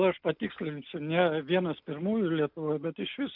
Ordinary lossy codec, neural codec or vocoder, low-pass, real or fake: MP3, 48 kbps; none; 5.4 kHz; real